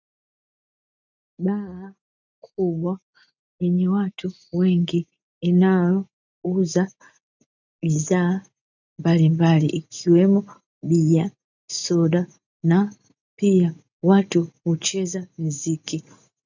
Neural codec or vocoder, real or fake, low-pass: none; real; 7.2 kHz